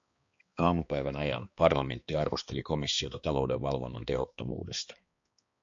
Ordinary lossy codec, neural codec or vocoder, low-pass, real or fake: MP3, 48 kbps; codec, 16 kHz, 2 kbps, X-Codec, HuBERT features, trained on balanced general audio; 7.2 kHz; fake